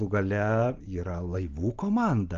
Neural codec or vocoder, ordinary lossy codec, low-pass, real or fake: none; Opus, 24 kbps; 7.2 kHz; real